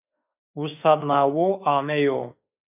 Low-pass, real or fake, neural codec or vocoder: 3.6 kHz; fake; autoencoder, 48 kHz, 32 numbers a frame, DAC-VAE, trained on Japanese speech